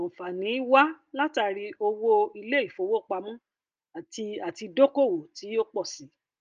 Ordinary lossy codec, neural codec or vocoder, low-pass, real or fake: Opus, 24 kbps; none; 7.2 kHz; real